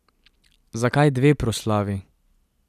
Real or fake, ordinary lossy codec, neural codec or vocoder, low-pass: real; none; none; 14.4 kHz